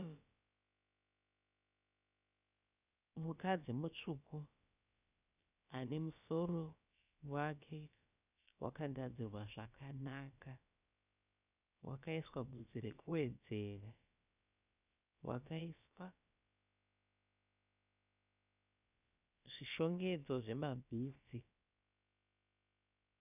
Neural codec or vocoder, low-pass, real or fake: codec, 16 kHz, about 1 kbps, DyCAST, with the encoder's durations; 3.6 kHz; fake